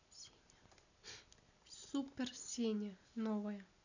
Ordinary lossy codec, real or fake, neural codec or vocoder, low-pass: none; real; none; 7.2 kHz